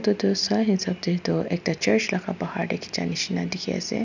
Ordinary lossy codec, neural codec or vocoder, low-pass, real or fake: none; none; 7.2 kHz; real